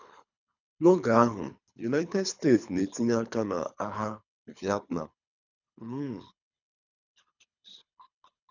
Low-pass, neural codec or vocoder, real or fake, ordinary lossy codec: 7.2 kHz; codec, 24 kHz, 3 kbps, HILCodec; fake; none